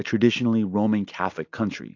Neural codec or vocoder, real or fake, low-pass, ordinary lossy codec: none; real; 7.2 kHz; AAC, 48 kbps